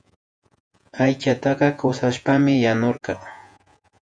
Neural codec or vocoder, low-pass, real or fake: vocoder, 48 kHz, 128 mel bands, Vocos; 9.9 kHz; fake